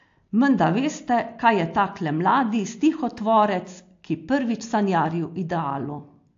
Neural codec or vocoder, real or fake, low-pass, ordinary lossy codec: none; real; 7.2 kHz; AAC, 48 kbps